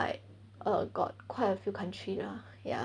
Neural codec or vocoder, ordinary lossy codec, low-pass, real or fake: none; none; 9.9 kHz; real